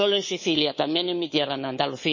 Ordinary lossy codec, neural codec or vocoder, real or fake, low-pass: MP3, 32 kbps; codec, 24 kHz, 3.1 kbps, DualCodec; fake; 7.2 kHz